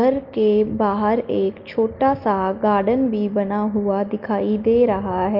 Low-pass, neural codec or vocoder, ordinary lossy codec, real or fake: 5.4 kHz; none; Opus, 32 kbps; real